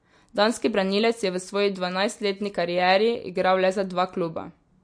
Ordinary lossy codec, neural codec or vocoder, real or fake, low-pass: MP3, 48 kbps; none; real; 9.9 kHz